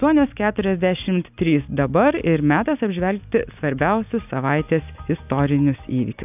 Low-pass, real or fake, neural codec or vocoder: 3.6 kHz; real; none